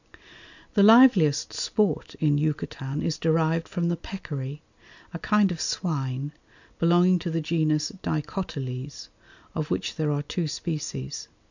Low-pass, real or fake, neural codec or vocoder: 7.2 kHz; real; none